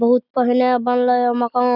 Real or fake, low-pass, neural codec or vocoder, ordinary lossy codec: real; 5.4 kHz; none; none